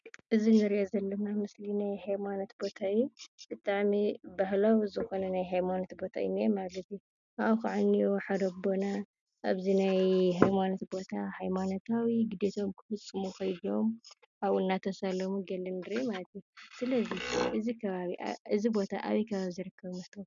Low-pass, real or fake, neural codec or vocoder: 7.2 kHz; real; none